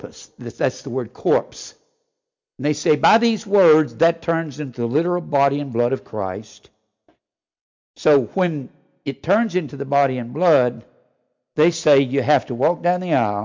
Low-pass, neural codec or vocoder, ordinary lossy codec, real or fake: 7.2 kHz; none; MP3, 64 kbps; real